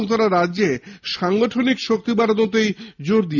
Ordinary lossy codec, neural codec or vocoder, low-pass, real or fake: none; none; 7.2 kHz; real